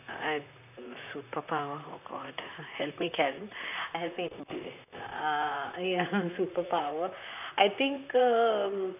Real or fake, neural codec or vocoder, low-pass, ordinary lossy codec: fake; vocoder, 44.1 kHz, 128 mel bands, Pupu-Vocoder; 3.6 kHz; none